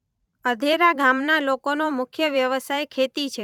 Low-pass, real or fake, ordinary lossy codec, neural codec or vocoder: 19.8 kHz; fake; none; vocoder, 44.1 kHz, 128 mel bands every 512 samples, BigVGAN v2